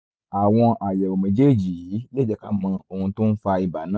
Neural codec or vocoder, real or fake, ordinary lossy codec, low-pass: none; real; none; none